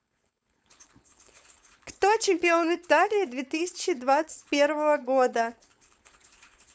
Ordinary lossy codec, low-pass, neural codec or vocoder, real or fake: none; none; codec, 16 kHz, 4.8 kbps, FACodec; fake